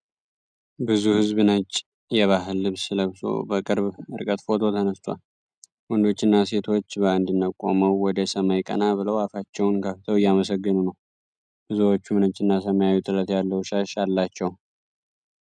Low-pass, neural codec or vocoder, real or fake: 9.9 kHz; none; real